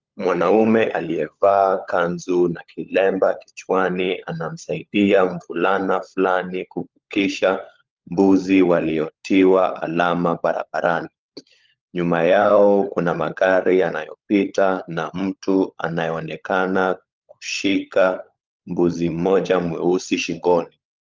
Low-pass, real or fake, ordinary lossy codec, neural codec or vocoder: 7.2 kHz; fake; Opus, 24 kbps; codec, 16 kHz, 16 kbps, FunCodec, trained on LibriTTS, 50 frames a second